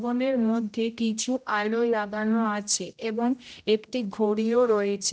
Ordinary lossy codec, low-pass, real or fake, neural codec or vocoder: none; none; fake; codec, 16 kHz, 0.5 kbps, X-Codec, HuBERT features, trained on general audio